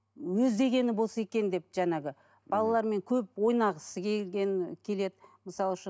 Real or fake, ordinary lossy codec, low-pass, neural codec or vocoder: real; none; none; none